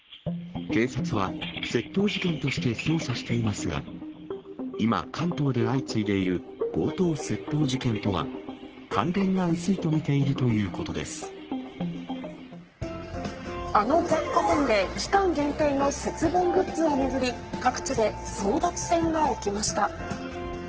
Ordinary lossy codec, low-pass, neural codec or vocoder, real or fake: Opus, 16 kbps; 7.2 kHz; codec, 44.1 kHz, 3.4 kbps, Pupu-Codec; fake